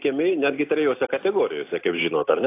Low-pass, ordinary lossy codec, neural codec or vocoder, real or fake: 3.6 kHz; AAC, 24 kbps; none; real